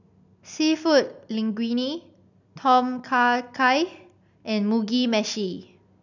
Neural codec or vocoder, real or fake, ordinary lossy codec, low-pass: none; real; none; 7.2 kHz